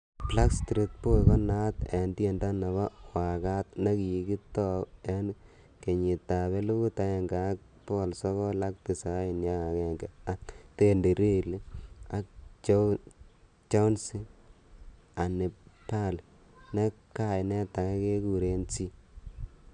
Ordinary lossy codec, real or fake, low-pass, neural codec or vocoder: none; real; 9.9 kHz; none